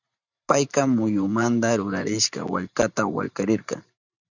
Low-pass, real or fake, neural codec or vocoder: 7.2 kHz; fake; vocoder, 44.1 kHz, 128 mel bands every 512 samples, BigVGAN v2